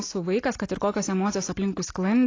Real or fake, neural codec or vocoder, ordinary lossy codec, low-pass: real; none; AAC, 32 kbps; 7.2 kHz